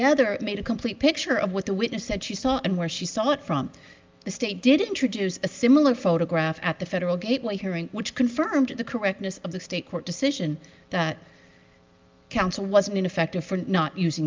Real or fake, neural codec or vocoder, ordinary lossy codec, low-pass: real; none; Opus, 24 kbps; 7.2 kHz